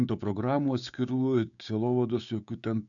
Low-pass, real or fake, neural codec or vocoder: 7.2 kHz; fake; codec, 16 kHz, 6 kbps, DAC